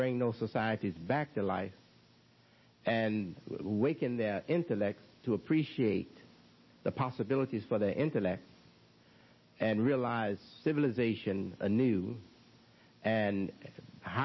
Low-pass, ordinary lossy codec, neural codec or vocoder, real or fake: 7.2 kHz; MP3, 24 kbps; none; real